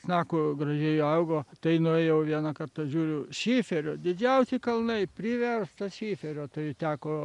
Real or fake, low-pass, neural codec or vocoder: real; 10.8 kHz; none